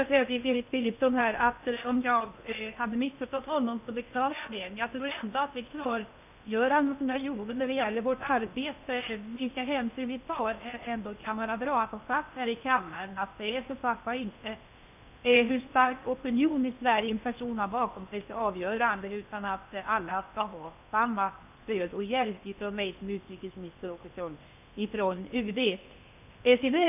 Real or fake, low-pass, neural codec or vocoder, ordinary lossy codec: fake; 3.6 kHz; codec, 16 kHz in and 24 kHz out, 0.8 kbps, FocalCodec, streaming, 65536 codes; none